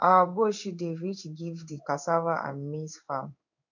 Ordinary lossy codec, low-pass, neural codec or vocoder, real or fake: none; 7.2 kHz; codec, 16 kHz in and 24 kHz out, 1 kbps, XY-Tokenizer; fake